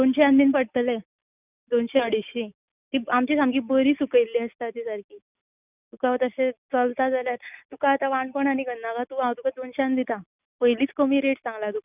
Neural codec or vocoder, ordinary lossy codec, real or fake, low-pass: none; none; real; 3.6 kHz